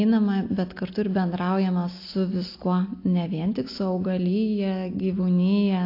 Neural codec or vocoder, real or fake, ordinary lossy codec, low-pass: none; real; AAC, 32 kbps; 5.4 kHz